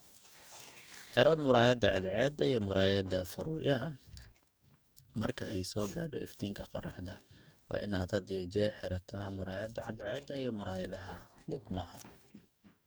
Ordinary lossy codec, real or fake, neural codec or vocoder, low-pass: none; fake; codec, 44.1 kHz, 2.6 kbps, DAC; none